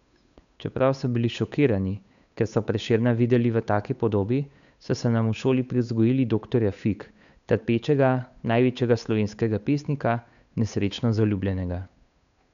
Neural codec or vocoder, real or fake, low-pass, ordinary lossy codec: codec, 16 kHz, 8 kbps, FunCodec, trained on Chinese and English, 25 frames a second; fake; 7.2 kHz; none